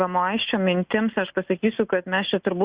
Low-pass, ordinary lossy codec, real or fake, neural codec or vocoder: 3.6 kHz; Opus, 64 kbps; real; none